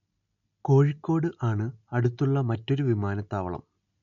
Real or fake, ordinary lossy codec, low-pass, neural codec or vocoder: real; MP3, 64 kbps; 7.2 kHz; none